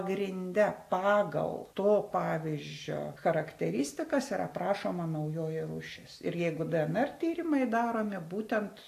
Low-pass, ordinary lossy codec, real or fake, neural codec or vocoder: 14.4 kHz; AAC, 64 kbps; real; none